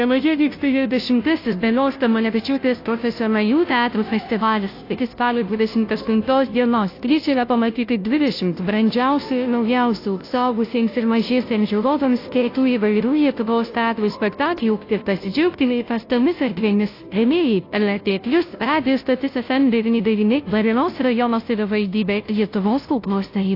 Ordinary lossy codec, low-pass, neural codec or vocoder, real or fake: AAC, 32 kbps; 5.4 kHz; codec, 16 kHz, 0.5 kbps, FunCodec, trained on Chinese and English, 25 frames a second; fake